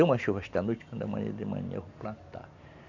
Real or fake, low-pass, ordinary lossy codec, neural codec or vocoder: real; 7.2 kHz; none; none